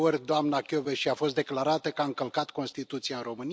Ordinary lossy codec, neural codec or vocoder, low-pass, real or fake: none; none; none; real